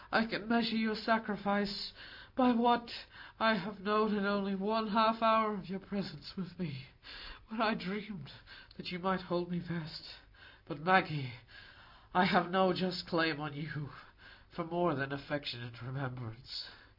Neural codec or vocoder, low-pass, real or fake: none; 5.4 kHz; real